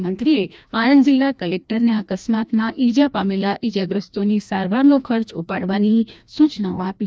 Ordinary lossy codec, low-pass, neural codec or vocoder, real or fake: none; none; codec, 16 kHz, 1 kbps, FreqCodec, larger model; fake